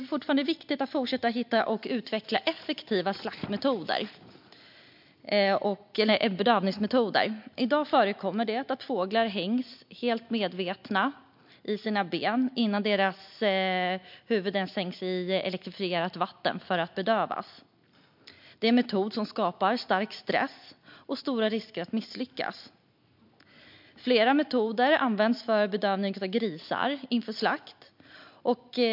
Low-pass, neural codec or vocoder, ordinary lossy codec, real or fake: 5.4 kHz; none; MP3, 48 kbps; real